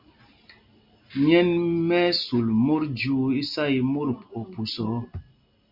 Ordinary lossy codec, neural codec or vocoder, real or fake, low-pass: Opus, 64 kbps; none; real; 5.4 kHz